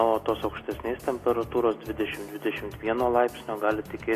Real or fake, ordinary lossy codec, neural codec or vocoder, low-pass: real; AAC, 48 kbps; none; 14.4 kHz